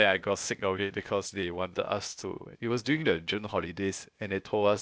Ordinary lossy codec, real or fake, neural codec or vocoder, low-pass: none; fake; codec, 16 kHz, 0.8 kbps, ZipCodec; none